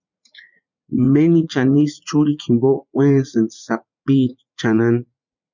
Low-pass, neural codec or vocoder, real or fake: 7.2 kHz; vocoder, 44.1 kHz, 80 mel bands, Vocos; fake